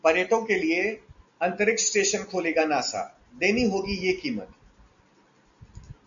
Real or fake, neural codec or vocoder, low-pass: real; none; 7.2 kHz